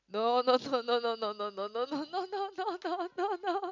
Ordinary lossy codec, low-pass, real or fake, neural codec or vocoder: none; 7.2 kHz; real; none